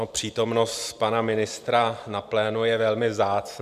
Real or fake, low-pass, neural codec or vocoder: fake; 14.4 kHz; vocoder, 44.1 kHz, 128 mel bands every 256 samples, BigVGAN v2